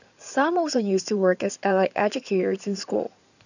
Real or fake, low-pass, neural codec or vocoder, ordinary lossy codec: fake; 7.2 kHz; codec, 16 kHz in and 24 kHz out, 2.2 kbps, FireRedTTS-2 codec; none